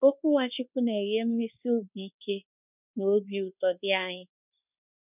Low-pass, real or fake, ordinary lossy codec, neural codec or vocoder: 3.6 kHz; fake; none; codec, 24 kHz, 1.2 kbps, DualCodec